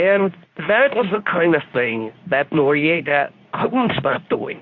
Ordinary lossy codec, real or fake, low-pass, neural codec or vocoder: MP3, 48 kbps; fake; 7.2 kHz; codec, 24 kHz, 0.9 kbps, WavTokenizer, medium speech release version 2